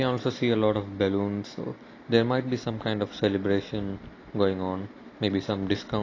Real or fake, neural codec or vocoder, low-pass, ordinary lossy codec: real; none; 7.2 kHz; AAC, 32 kbps